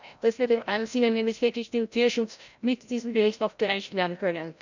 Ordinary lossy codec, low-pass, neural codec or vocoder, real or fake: none; 7.2 kHz; codec, 16 kHz, 0.5 kbps, FreqCodec, larger model; fake